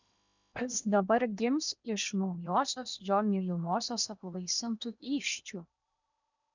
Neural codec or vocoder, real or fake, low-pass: codec, 16 kHz in and 24 kHz out, 0.8 kbps, FocalCodec, streaming, 65536 codes; fake; 7.2 kHz